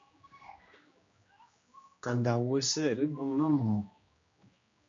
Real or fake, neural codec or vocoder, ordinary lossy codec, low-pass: fake; codec, 16 kHz, 1 kbps, X-Codec, HuBERT features, trained on general audio; MP3, 48 kbps; 7.2 kHz